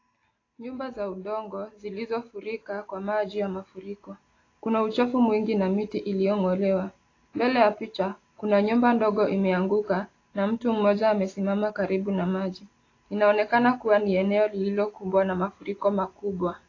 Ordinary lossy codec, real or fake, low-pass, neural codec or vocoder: AAC, 32 kbps; real; 7.2 kHz; none